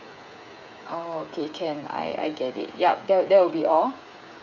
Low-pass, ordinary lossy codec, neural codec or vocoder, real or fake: 7.2 kHz; none; codec, 16 kHz, 8 kbps, FreqCodec, smaller model; fake